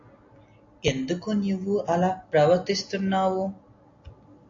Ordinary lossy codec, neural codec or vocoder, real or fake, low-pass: AAC, 48 kbps; none; real; 7.2 kHz